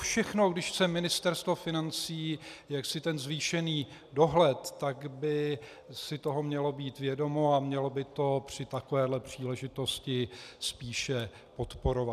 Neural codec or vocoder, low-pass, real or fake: none; 14.4 kHz; real